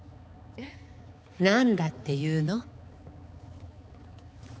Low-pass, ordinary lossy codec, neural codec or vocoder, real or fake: none; none; codec, 16 kHz, 4 kbps, X-Codec, HuBERT features, trained on balanced general audio; fake